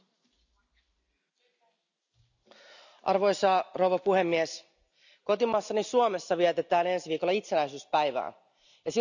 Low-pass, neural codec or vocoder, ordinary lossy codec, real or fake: 7.2 kHz; none; none; real